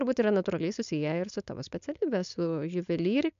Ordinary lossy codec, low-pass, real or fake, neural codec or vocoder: MP3, 64 kbps; 7.2 kHz; fake; codec, 16 kHz, 4.8 kbps, FACodec